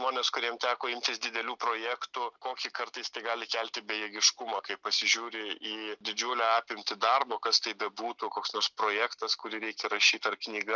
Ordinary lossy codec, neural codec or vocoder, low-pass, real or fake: Opus, 64 kbps; none; 7.2 kHz; real